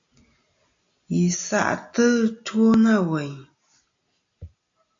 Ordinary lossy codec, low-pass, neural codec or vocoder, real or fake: AAC, 48 kbps; 7.2 kHz; none; real